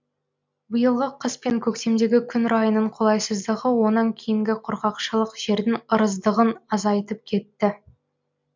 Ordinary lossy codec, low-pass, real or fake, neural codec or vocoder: MP3, 64 kbps; 7.2 kHz; real; none